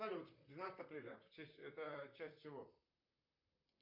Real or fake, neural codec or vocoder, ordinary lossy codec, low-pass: fake; vocoder, 44.1 kHz, 128 mel bands, Pupu-Vocoder; MP3, 48 kbps; 5.4 kHz